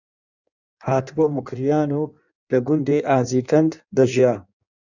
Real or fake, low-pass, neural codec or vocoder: fake; 7.2 kHz; codec, 16 kHz in and 24 kHz out, 1.1 kbps, FireRedTTS-2 codec